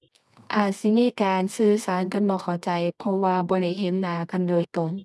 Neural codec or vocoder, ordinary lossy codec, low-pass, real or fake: codec, 24 kHz, 0.9 kbps, WavTokenizer, medium music audio release; none; none; fake